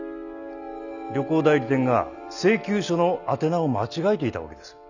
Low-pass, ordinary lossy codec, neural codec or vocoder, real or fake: 7.2 kHz; none; none; real